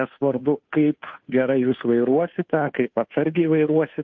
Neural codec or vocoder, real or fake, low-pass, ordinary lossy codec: codec, 16 kHz, 2 kbps, FunCodec, trained on Chinese and English, 25 frames a second; fake; 7.2 kHz; MP3, 48 kbps